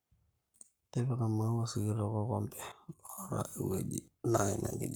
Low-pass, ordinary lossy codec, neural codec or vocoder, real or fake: none; none; codec, 44.1 kHz, 7.8 kbps, Pupu-Codec; fake